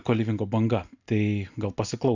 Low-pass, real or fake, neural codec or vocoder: 7.2 kHz; real; none